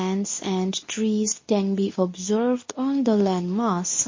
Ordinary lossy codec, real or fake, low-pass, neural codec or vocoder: MP3, 32 kbps; fake; 7.2 kHz; codec, 24 kHz, 0.9 kbps, WavTokenizer, medium speech release version 2